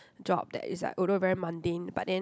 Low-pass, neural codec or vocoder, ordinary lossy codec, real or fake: none; none; none; real